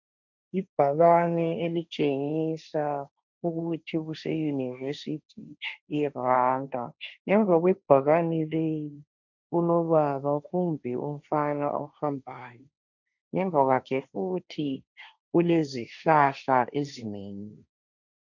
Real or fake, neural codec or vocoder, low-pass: fake; codec, 16 kHz, 1.1 kbps, Voila-Tokenizer; 7.2 kHz